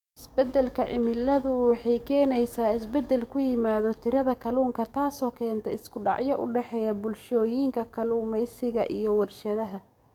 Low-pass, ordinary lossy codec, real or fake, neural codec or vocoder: 19.8 kHz; none; fake; codec, 44.1 kHz, 7.8 kbps, DAC